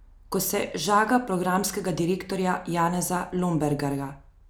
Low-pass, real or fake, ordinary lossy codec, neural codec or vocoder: none; real; none; none